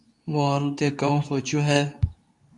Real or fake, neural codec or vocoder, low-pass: fake; codec, 24 kHz, 0.9 kbps, WavTokenizer, medium speech release version 2; 10.8 kHz